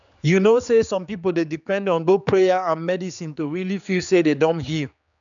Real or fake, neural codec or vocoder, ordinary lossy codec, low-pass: fake; codec, 16 kHz, 4 kbps, X-Codec, HuBERT features, trained on general audio; none; 7.2 kHz